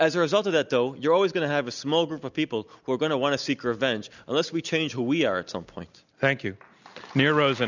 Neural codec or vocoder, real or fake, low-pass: none; real; 7.2 kHz